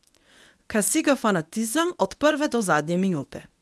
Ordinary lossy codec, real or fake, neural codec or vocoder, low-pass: none; fake; codec, 24 kHz, 0.9 kbps, WavTokenizer, medium speech release version 1; none